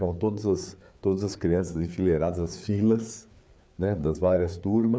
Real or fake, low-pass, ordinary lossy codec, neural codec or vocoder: fake; none; none; codec, 16 kHz, 4 kbps, FreqCodec, larger model